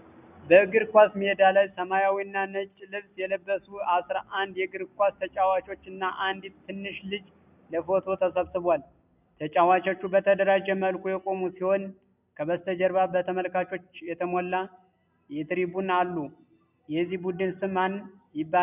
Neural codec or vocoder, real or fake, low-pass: none; real; 3.6 kHz